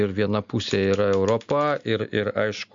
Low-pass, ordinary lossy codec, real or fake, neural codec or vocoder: 7.2 kHz; MP3, 64 kbps; real; none